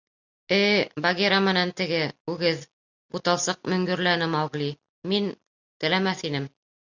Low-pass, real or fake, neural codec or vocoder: 7.2 kHz; real; none